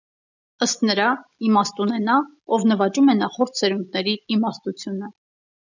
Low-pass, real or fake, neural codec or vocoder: 7.2 kHz; real; none